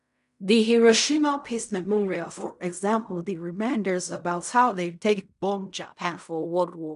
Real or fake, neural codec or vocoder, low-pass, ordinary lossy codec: fake; codec, 16 kHz in and 24 kHz out, 0.4 kbps, LongCat-Audio-Codec, fine tuned four codebook decoder; 10.8 kHz; none